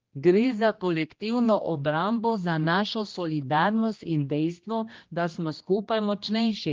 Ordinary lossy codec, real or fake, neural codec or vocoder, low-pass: Opus, 32 kbps; fake; codec, 16 kHz, 1 kbps, X-Codec, HuBERT features, trained on general audio; 7.2 kHz